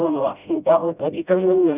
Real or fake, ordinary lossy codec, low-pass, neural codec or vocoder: fake; none; 3.6 kHz; codec, 16 kHz, 0.5 kbps, FreqCodec, smaller model